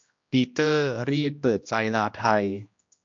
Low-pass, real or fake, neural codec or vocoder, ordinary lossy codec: 7.2 kHz; fake; codec, 16 kHz, 1 kbps, X-Codec, HuBERT features, trained on general audio; MP3, 48 kbps